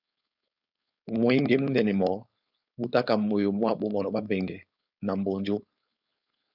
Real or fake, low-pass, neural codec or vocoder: fake; 5.4 kHz; codec, 16 kHz, 4.8 kbps, FACodec